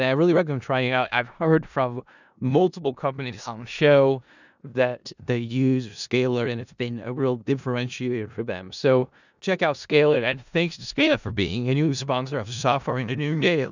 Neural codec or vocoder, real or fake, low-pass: codec, 16 kHz in and 24 kHz out, 0.4 kbps, LongCat-Audio-Codec, four codebook decoder; fake; 7.2 kHz